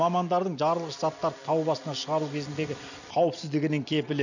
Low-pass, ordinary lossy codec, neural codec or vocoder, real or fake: 7.2 kHz; none; none; real